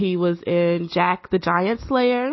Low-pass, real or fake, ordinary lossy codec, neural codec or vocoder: 7.2 kHz; real; MP3, 24 kbps; none